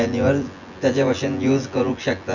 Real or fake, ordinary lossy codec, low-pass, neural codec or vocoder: fake; none; 7.2 kHz; vocoder, 24 kHz, 100 mel bands, Vocos